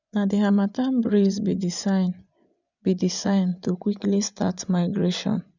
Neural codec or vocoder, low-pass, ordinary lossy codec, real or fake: vocoder, 24 kHz, 100 mel bands, Vocos; 7.2 kHz; none; fake